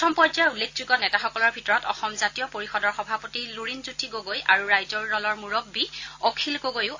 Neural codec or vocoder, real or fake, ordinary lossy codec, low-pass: none; real; AAC, 48 kbps; 7.2 kHz